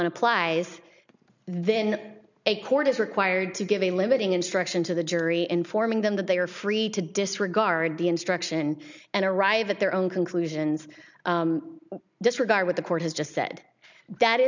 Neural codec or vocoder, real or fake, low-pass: none; real; 7.2 kHz